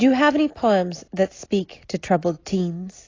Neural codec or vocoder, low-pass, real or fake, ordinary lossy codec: none; 7.2 kHz; real; AAC, 32 kbps